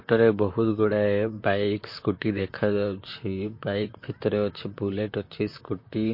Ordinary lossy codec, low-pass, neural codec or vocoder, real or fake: MP3, 32 kbps; 5.4 kHz; vocoder, 44.1 kHz, 128 mel bands, Pupu-Vocoder; fake